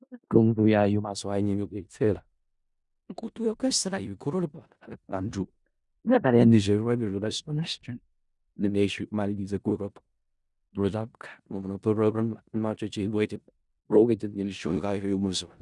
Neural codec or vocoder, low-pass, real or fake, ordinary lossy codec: codec, 16 kHz in and 24 kHz out, 0.4 kbps, LongCat-Audio-Codec, four codebook decoder; 10.8 kHz; fake; Opus, 64 kbps